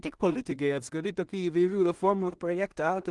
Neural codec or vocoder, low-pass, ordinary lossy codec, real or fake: codec, 16 kHz in and 24 kHz out, 0.4 kbps, LongCat-Audio-Codec, two codebook decoder; 10.8 kHz; Opus, 24 kbps; fake